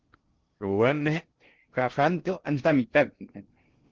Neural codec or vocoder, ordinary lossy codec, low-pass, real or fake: codec, 16 kHz in and 24 kHz out, 0.6 kbps, FocalCodec, streaming, 2048 codes; Opus, 16 kbps; 7.2 kHz; fake